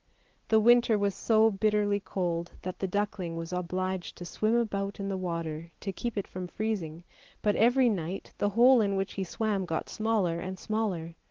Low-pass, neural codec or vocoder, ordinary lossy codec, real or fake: 7.2 kHz; none; Opus, 16 kbps; real